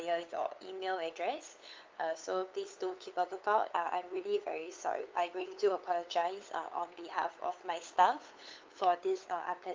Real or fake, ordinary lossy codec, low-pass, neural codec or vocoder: fake; Opus, 32 kbps; 7.2 kHz; codec, 16 kHz, 8 kbps, FunCodec, trained on LibriTTS, 25 frames a second